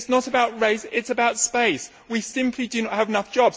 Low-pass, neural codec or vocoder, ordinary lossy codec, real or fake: none; none; none; real